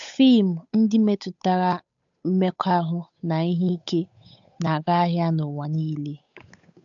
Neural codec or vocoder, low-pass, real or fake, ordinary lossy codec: codec, 16 kHz, 8 kbps, FunCodec, trained on Chinese and English, 25 frames a second; 7.2 kHz; fake; AAC, 64 kbps